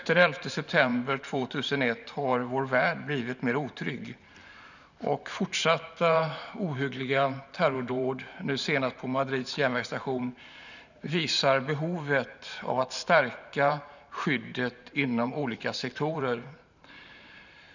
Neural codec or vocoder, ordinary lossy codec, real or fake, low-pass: vocoder, 44.1 kHz, 128 mel bands every 512 samples, BigVGAN v2; none; fake; 7.2 kHz